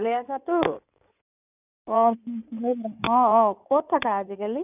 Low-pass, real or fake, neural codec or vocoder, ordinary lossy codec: 3.6 kHz; fake; codec, 16 kHz in and 24 kHz out, 2.2 kbps, FireRedTTS-2 codec; none